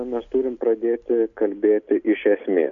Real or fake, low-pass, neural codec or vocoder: real; 7.2 kHz; none